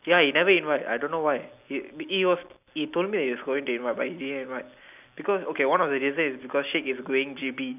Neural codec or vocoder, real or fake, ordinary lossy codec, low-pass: none; real; none; 3.6 kHz